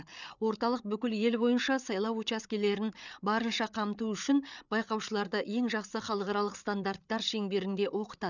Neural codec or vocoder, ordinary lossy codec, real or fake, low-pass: codec, 16 kHz, 8 kbps, FreqCodec, larger model; none; fake; 7.2 kHz